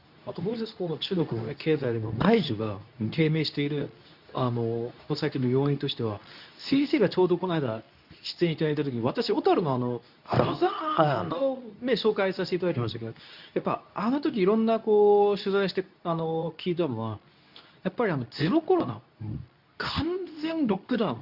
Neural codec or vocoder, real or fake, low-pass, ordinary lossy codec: codec, 24 kHz, 0.9 kbps, WavTokenizer, medium speech release version 2; fake; 5.4 kHz; none